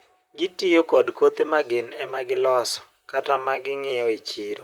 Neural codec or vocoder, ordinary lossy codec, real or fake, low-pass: vocoder, 44.1 kHz, 128 mel bands, Pupu-Vocoder; Opus, 64 kbps; fake; 19.8 kHz